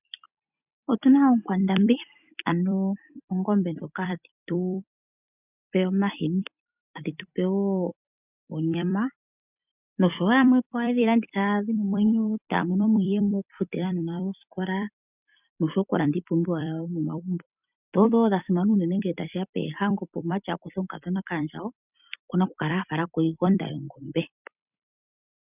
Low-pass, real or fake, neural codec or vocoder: 3.6 kHz; fake; vocoder, 44.1 kHz, 128 mel bands every 256 samples, BigVGAN v2